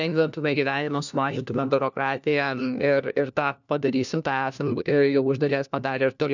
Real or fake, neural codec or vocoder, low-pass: fake; codec, 16 kHz, 1 kbps, FunCodec, trained on LibriTTS, 50 frames a second; 7.2 kHz